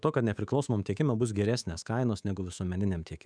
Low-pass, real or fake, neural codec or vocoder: 9.9 kHz; fake; codec, 24 kHz, 3.1 kbps, DualCodec